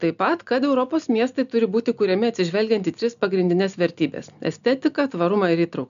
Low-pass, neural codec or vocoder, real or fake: 7.2 kHz; none; real